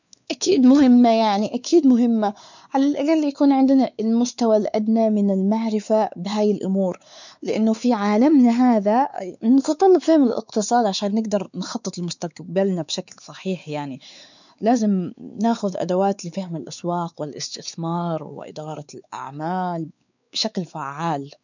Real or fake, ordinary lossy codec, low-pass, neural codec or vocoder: fake; none; 7.2 kHz; codec, 16 kHz, 4 kbps, X-Codec, WavLM features, trained on Multilingual LibriSpeech